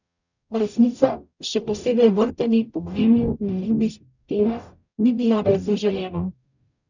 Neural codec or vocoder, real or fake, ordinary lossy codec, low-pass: codec, 44.1 kHz, 0.9 kbps, DAC; fake; none; 7.2 kHz